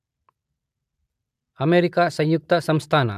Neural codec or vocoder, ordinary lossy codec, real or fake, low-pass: none; AAC, 96 kbps; real; 14.4 kHz